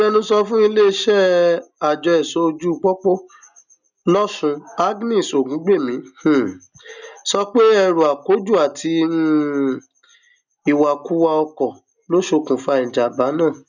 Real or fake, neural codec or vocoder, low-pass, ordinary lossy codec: real; none; 7.2 kHz; none